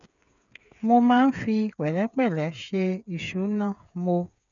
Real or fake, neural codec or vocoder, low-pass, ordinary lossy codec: fake; codec, 16 kHz, 8 kbps, FreqCodec, smaller model; 7.2 kHz; none